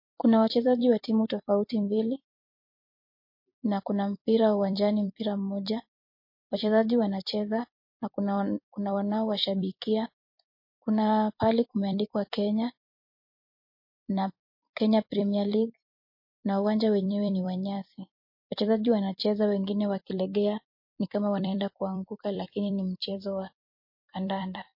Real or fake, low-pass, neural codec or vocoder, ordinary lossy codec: fake; 5.4 kHz; vocoder, 44.1 kHz, 128 mel bands every 256 samples, BigVGAN v2; MP3, 32 kbps